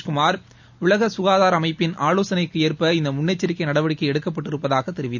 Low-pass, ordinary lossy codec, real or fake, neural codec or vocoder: 7.2 kHz; none; real; none